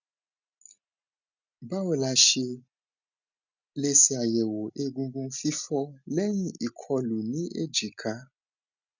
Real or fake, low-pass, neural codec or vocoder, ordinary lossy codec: real; 7.2 kHz; none; none